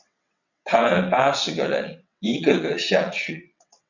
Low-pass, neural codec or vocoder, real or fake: 7.2 kHz; vocoder, 22.05 kHz, 80 mel bands, WaveNeXt; fake